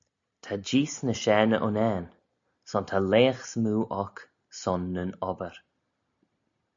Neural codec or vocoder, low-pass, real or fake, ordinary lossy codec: none; 7.2 kHz; real; MP3, 64 kbps